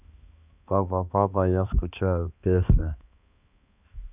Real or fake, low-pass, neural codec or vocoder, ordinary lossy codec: fake; 3.6 kHz; codec, 16 kHz, 2 kbps, X-Codec, HuBERT features, trained on balanced general audio; none